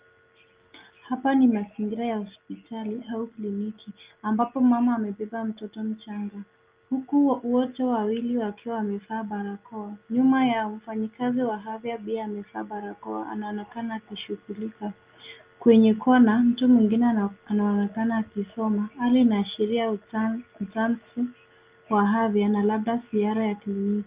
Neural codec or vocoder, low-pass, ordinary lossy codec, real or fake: none; 3.6 kHz; Opus, 32 kbps; real